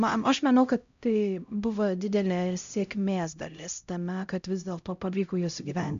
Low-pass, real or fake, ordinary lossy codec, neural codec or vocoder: 7.2 kHz; fake; MP3, 64 kbps; codec, 16 kHz, 0.5 kbps, X-Codec, HuBERT features, trained on LibriSpeech